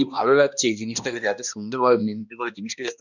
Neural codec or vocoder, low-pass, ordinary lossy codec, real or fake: codec, 16 kHz, 1 kbps, X-Codec, HuBERT features, trained on balanced general audio; 7.2 kHz; none; fake